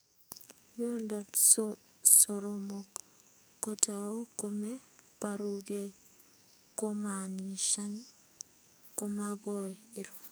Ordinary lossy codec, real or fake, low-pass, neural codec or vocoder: none; fake; none; codec, 44.1 kHz, 2.6 kbps, SNAC